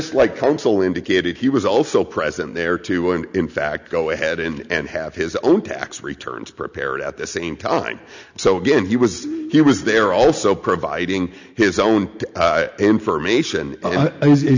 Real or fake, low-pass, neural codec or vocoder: real; 7.2 kHz; none